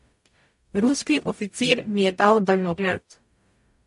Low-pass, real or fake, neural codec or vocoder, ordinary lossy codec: 14.4 kHz; fake; codec, 44.1 kHz, 0.9 kbps, DAC; MP3, 48 kbps